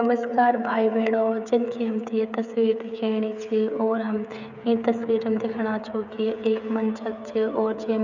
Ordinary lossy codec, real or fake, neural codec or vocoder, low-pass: none; fake; codec, 16 kHz, 16 kbps, FreqCodec, smaller model; 7.2 kHz